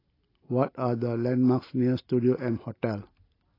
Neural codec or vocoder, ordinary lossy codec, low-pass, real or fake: none; AAC, 24 kbps; 5.4 kHz; real